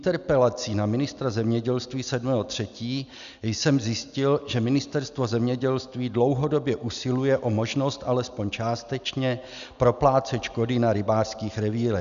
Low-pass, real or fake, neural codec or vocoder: 7.2 kHz; real; none